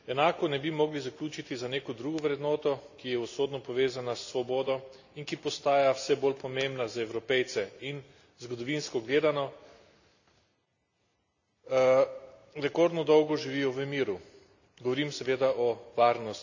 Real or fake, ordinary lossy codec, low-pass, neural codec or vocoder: real; none; 7.2 kHz; none